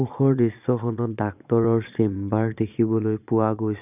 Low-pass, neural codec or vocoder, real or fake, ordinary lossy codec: 3.6 kHz; vocoder, 22.05 kHz, 80 mel bands, Vocos; fake; none